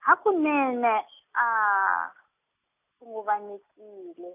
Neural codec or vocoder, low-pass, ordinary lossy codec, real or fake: none; 3.6 kHz; none; real